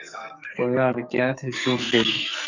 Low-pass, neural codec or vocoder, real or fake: 7.2 kHz; codec, 44.1 kHz, 2.6 kbps, SNAC; fake